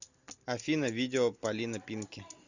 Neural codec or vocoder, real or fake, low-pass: none; real; 7.2 kHz